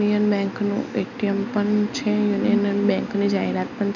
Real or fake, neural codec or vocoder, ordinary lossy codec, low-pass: real; none; none; 7.2 kHz